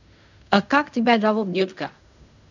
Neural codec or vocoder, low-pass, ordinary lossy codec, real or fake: codec, 16 kHz in and 24 kHz out, 0.4 kbps, LongCat-Audio-Codec, fine tuned four codebook decoder; 7.2 kHz; none; fake